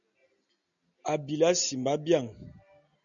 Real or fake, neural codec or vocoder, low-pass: real; none; 7.2 kHz